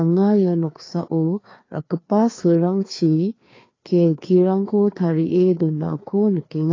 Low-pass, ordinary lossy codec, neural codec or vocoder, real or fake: 7.2 kHz; AAC, 32 kbps; codec, 16 kHz, 2 kbps, FreqCodec, larger model; fake